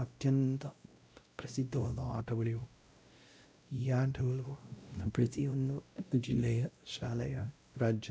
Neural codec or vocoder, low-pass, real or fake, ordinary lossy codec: codec, 16 kHz, 0.5 kbps, X-Codec, WavLM features, trained on Multilingual LibriSpeech; none; fake; none